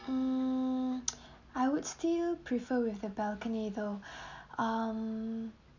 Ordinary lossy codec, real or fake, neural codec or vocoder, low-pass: none; real; none; 7.2 kHz